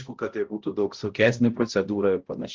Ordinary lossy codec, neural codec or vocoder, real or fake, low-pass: Opus, 16 kbps; codec, 16 kHz, 0.5 kbps, X-Codec, HuBERT features, trained on balanced general audio; fake; 7.2 kHz